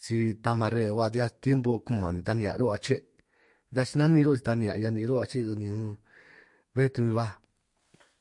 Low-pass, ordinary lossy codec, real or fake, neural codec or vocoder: 10.8 kHz; MP3, 48 kbps; fake; codec, 32 kHz, 1.9 kbps, SNAC